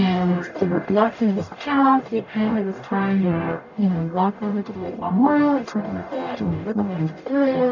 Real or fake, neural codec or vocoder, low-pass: fake; codec, 44.1 kHz, 0.9 kbps, DAC; 7.2 kHz